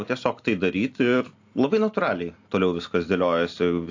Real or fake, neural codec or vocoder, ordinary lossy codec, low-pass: real; none; AAC, 48 kbps; 7.2 kHz